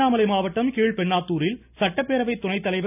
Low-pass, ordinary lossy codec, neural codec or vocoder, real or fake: 3.6 kHz; none; none; real